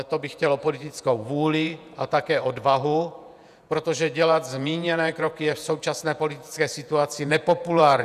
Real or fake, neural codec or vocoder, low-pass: fake; vocoder, 48 kHz, 128 mel bands, Vocos; 14.4 kHz